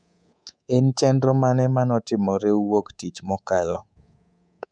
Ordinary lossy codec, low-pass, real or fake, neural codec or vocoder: none; 9.9 kHz; fake; codec, 24 kHz, 3.1 kbps, DualCodec